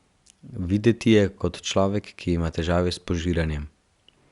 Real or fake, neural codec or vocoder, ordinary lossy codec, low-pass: real; none; Opus, 64 kbps; 10.8 kHz